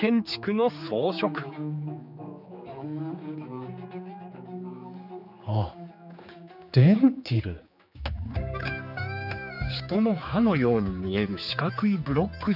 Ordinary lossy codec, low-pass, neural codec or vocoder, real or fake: none; 5.4 kHz; codec, 16 kHz, 4 kbps, X-Codec, HuBERT features, trained on general audio; fake